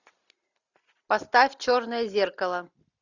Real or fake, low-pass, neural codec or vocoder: real; 7.2 kHz; none